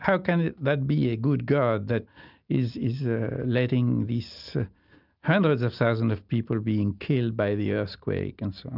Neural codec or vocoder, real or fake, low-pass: none; real; 5.4 kHz